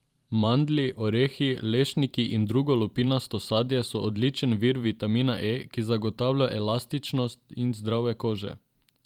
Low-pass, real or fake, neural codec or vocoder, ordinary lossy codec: 19.8 kHz; fake; vocoder, 44.1 kHz, 128 mel bands every 512 samples, BigVGAN v2; Opus, 32 kbps